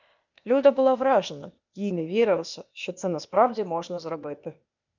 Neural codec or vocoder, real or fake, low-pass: codec, 16 kHz, 0.8 kbps, ZipCodec; fake; 7.2 kHz